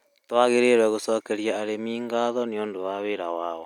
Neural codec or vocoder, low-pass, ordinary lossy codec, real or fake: none; 19.8 kHz; none; real